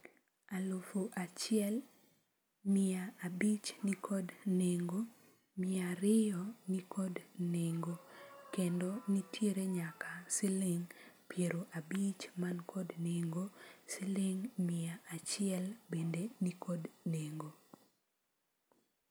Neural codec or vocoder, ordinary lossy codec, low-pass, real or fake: none; none; none; real